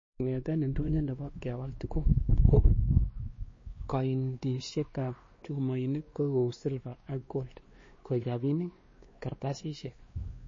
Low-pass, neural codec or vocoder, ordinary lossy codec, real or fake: 7.2 kHz; codec, 16 kHz, 2 kbps, X-Codec, WavLM features, trained on Multilingual LibriSpeech; MP3, 32 kbps; fake